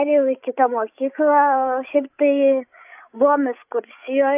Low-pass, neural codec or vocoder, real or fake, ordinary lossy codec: 3.6 kHz; codec, 16 kHz, 8 kbps, FreqCodec, larger model; fake; AAC, 32 kbps